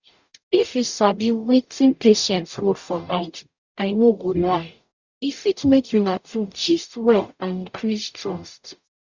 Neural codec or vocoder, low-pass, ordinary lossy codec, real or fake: codec, 44.1 kHz, 0.9 kbps, DAC; 7.2 kHz; Opus, 64 kbps; fake